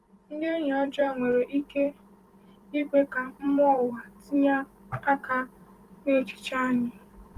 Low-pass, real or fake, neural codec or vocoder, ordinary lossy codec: 14.4 kHz; fake; vocoder, 44.1 kHz, 128 mel bands every 256 samples, BigVGAN v2; Opus, 24 kbps